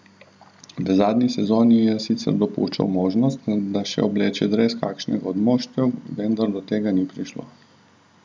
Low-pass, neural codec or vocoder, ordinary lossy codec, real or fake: none; none; none; real